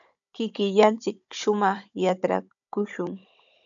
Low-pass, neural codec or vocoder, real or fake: 7.2 kHz; codec, 16 kHz, 16 kbps, FunCodec, trained on Chinese and English, 50 frames a second; fake